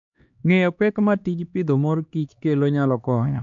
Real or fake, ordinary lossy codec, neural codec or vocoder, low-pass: fake; MP3, 48 kbps; codec, 16 kHz, 2 kbps, X-Codec, HuBERT features, trained on LibriSpeech; 7.2 kHz